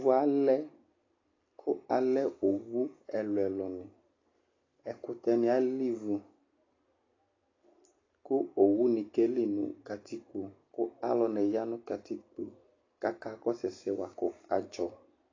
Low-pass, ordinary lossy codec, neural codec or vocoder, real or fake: 7.2 kHz; AAC, 32 kbps; none; real